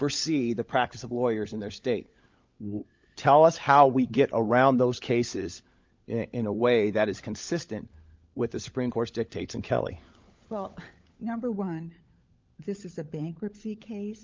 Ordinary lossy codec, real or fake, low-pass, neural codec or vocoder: Opus, 24 kbps; fake; 7.2 kHz; codec, 16 kHz, 16 kbps, FunCodec, trained on LibriTTS, 50 frames a second